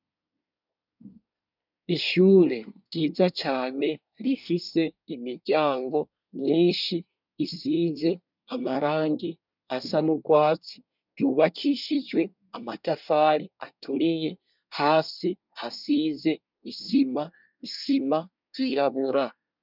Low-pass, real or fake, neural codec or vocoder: 5.4 kHz; fake; codec, 24 kHz, 1 kbps, SNAC